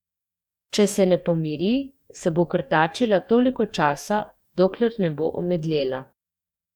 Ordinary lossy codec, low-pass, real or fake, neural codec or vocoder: none; 19.8 kHz; fake; codec, 44.1 kHz, 2.6 kbps, DAC